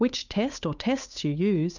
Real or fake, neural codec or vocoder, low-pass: fake; codec, 16 kHz, 8 kbps, FunCodec, trained on Chinese and English, 25 frames a second; 7.2 kHz